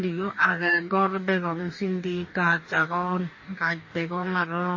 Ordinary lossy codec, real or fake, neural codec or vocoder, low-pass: MP3, 32 kbps; fake; codec, 44.1 kHz, 2.6 kbps, DAC; 7.2 kHz